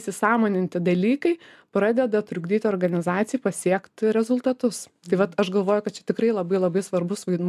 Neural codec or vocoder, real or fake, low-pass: none; real; 14.4 kHz